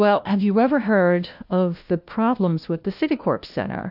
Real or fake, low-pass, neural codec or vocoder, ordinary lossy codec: fake; 5.4 kHz; codec, 16 kHz, 1 kbps, FunCodec, trained on LibriTTS, 50 frames a second; AAC, 48 kbps